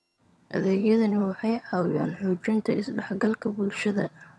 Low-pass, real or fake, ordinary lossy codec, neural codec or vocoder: none; fake; none; vocoder, 22.05 kHz, 80 mel bands, HiFi-GAN